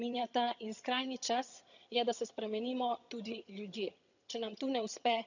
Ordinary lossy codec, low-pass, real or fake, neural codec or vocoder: none; 7.2 kHz; fake; vocoder, 22.05 kHz, 80 mel bands, HiFi-GAN